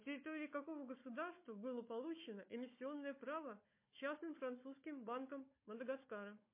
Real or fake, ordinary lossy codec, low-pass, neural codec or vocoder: fake; MP3, 24 kbps; 3.6 kHz; codec, 16 kHz, 4 kbps, FunCodec, trained on Chinese and English, 50 frames a second